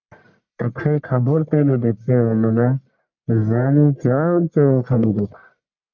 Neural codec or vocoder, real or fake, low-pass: codec, 44.1 kHz, 1.7 kbps, Pupu-Codec; fake; 7.2 kHz